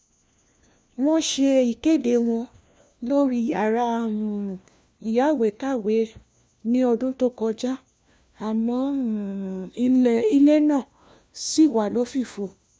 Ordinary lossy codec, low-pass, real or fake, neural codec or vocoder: none; none; fake; codec, 16 kHz, 1 kbps, FunCodec, trained on LibriTTS, 50 frames a second